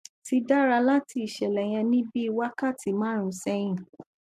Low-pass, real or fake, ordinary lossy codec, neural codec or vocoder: 14.4 kHz; real; MP3, 64 kbps; none